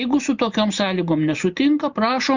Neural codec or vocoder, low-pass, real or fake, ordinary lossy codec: none; 7.2 kHz; real; Opus, 64 kbps